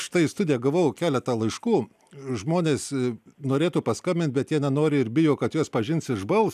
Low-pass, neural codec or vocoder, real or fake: 14.4 kHz; none; real